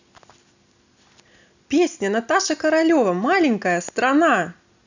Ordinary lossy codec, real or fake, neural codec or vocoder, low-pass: none; real; none; 7.2 kHz